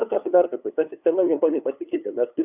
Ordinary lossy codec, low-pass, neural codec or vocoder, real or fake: AAC, 32 kbps; 3.6 kHz; codec, 16 kHz, 2 kbps, FunCodec, trained on LibriTTS, 25 frames a second; fake